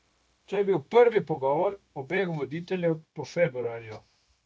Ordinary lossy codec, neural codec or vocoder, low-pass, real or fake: none; codec, 16 kHz, 0.9 kbps, LongCat-Audio-Codec; none; fake